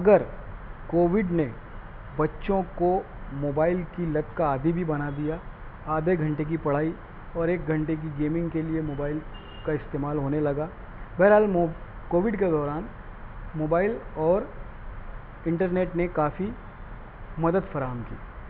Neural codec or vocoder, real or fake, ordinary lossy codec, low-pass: none; real; none; 5.4 kHz